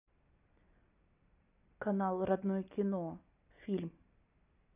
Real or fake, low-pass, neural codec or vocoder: real; 3.6 kHz; none